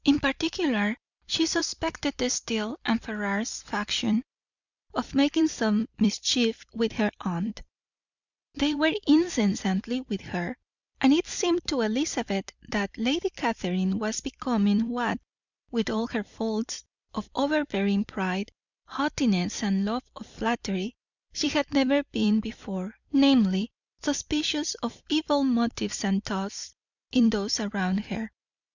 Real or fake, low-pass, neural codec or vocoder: fake; 7.2 kHz; vocoder, 44.1 kHz, 128 mel bands every 256 samples, BigVGAN v2